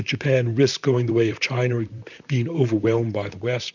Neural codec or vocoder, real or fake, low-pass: vocoder, 44.1 kHz, 128 mel bands, Pupu-Vocoder; fake; 7.2 kHz